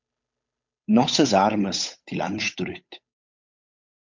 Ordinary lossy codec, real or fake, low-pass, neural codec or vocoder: MP3, 48 kbps; fake; 7.2 kHz; codec, 16 kHz, 8 kbps, FunCodec, trained on Chinese and English, 25 frames a second